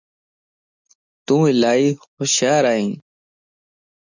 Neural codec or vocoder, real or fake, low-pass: none; real; 7.2 kHz